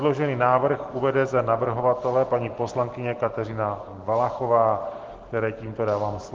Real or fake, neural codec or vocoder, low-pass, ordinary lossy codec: real; none; 7.2 kHz; Opus, 16 kbps